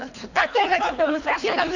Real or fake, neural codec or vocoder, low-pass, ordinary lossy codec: fake; codec, 24 kHz, 3 kbps, HILCodec; 7.2 kHz; AAC, 48 kbps